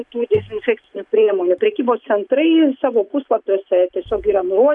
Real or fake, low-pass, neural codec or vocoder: real; 10.8 kHz; none